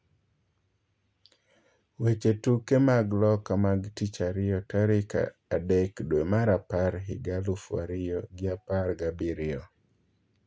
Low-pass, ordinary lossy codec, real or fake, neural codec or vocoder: none; none; real; none